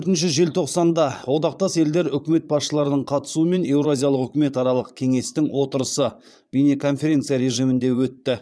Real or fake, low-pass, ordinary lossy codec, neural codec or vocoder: fake; none; none; vocoder, 22.05 kHz, 80 mel bands, Vocos